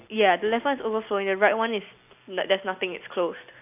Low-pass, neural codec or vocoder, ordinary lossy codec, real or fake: 3.6 kHz; none; none; real